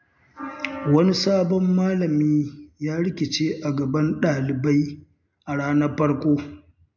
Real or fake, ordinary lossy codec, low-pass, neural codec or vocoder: real; MP3, 64 kbps; 7.2 kHz; none